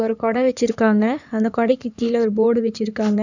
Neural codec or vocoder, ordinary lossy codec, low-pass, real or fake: codec, 16 kHz in and 24 kHz out, 2.2 kbps, FireRedTTS-2 codec; none; 7.2 kHz; fake